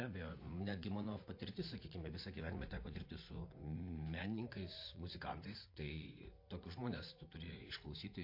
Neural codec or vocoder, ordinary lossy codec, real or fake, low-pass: vocoder, 44.1 kHz, 80 mel bands, Vocos; MP3, 32 kbps; fake; 5.4 kHz